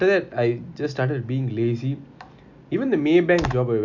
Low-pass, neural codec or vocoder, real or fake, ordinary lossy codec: 7.2 kHz; none; real; none